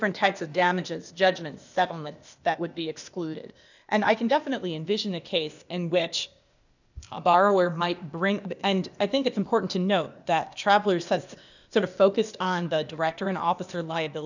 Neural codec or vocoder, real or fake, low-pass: codec, 16 kHz, 0.8 kbps, ZipCodec; fake; 7.2 kHz